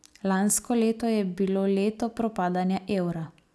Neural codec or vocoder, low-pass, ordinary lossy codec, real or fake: none; none; none; real